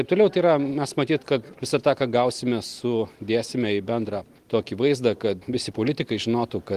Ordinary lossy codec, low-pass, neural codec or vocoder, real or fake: Opus, 24 kbps; 14.4 kHz; none; real